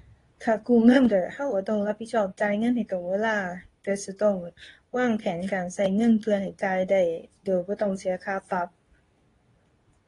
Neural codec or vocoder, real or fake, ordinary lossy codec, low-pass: codec, 24 kHz, 0.9 kbps, WavTokenizer, medium speech release version 2; fake; AAC, 32 kbps; 10.8 kHz